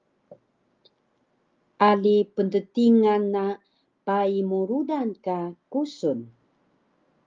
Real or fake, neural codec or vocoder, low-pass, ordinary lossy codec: real; none; 7.2 kHz; Opus, 32 kbps